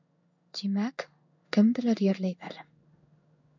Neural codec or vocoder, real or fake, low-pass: codec, 16 kHz in and 24 kHz out, 1 kbps, XY-Tokenizer; fake; 7.2 kHz